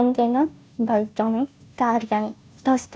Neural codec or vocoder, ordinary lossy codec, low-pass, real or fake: codec, 16 kHz, 0.5 kbps, FunCodec, trained on Chinese and English, 25 frames a second; none; none; fake